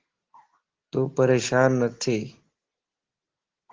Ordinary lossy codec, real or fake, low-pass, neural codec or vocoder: Opus, 16 kbps; real; 7.2 kHz; none